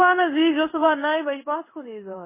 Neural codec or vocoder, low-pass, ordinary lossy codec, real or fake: codec, 16 kHz in and 24 kHz out, 1 kbps, XY-Tokenizer; 3.6 kHz; MP3, 16 kbps; fake